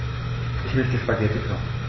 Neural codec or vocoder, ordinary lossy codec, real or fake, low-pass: autoencoder, 48 kHz, 128 numbers a frame, DAC-VAE, trained on Japanese speech; MP3, 24 kbps; fake; 7.2 kHz